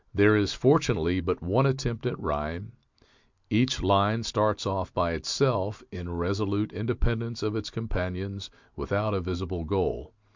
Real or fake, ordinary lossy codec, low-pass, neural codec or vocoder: real; MP3, 64 kbps; 7.2 kHz; none